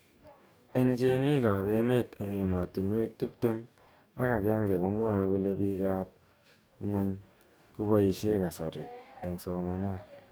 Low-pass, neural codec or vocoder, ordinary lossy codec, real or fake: none; codec, 44.1 kHz, 2.6 kbps, DAC; none; fake